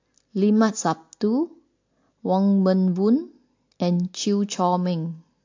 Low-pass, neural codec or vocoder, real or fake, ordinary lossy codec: 7.2 kHz; none; real; AAC, 48 kbps